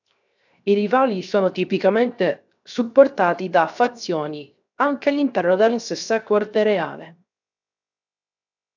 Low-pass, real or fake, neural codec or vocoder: 7.2 kHz; fake; codec, 16 kHz, 0.7 kbps, FocalCodec